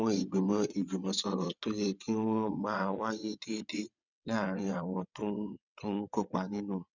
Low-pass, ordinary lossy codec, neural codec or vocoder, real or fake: 7.2 kHz; none; vocoder, 22.05 kHz, 80 mel bands, WaveNeXt; fake